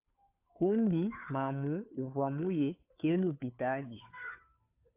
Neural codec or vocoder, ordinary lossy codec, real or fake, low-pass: codec, 16 kHz, 2 kbps, FunCodec, trained on Chinese and English, 25 frames a second; AAC, 32 kbps; fake; 3.6 kHz